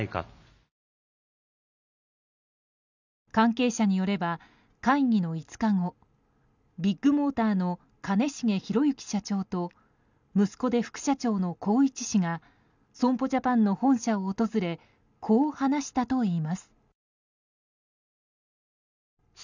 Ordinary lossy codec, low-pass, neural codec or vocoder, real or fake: none; 7.2 kHz; none; real